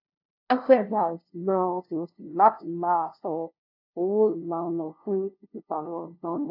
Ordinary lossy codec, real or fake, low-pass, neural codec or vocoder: AAC, 48 kbps; fake; 5.4 kHz; codec, 16 kHz, 0.5 kbps, FunCodec, trained on LibriTTS, 25 frames a second